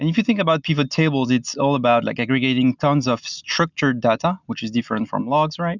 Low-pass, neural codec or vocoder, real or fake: 7.2 kHz; none; real